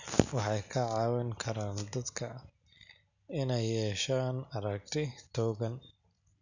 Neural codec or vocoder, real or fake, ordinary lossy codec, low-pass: none; real; none; 7.2 kHz